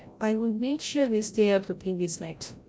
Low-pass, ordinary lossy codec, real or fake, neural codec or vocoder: none; none; fake; codec, 16 kHz, 0.5 kbps, FreqCodec, larger model